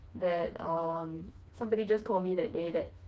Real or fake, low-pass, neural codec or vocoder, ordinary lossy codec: fake; none; codec, 16 kHz, 2 kbps, FreqCodec, smaller model; none